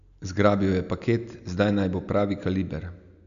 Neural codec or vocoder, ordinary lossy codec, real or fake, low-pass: none; none; real; 7.2 kHz